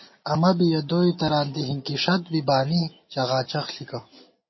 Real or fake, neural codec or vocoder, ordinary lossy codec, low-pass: real; none; MP3, 24 kbps; 7.2 kHz